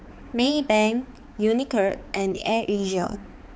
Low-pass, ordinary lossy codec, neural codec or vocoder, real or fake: none; none; codec, 16 kHz, 4 kbps, X-Codec, HuBERT features, trained on balanced general audio; fake